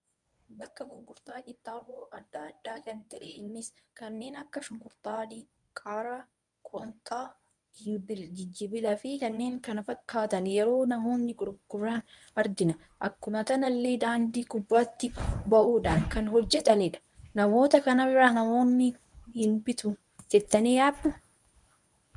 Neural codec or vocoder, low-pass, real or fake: codec, 24 kHz, 0.9 kbps, WavTokenizer, medium speech release version 1; 10.8 kHz; fake